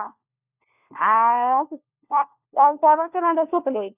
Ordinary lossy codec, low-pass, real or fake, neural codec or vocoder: none; 3.6 kHz; fake; codec, 16 kHz, 1 kbps, FunCodec, trained on LibriTTS, 50 frames a second